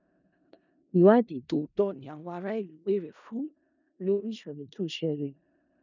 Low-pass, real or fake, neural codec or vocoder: 7.2 kHz; fake; codec, 16 kHz in and 24 kHz out, 0.4 kbps, LongCat-Audio-Codec, four codebook decoder